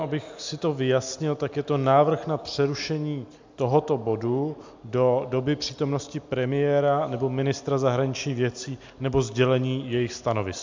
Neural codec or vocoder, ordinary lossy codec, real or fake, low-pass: none; MP3, 64 kbps; real; 7.2 kHz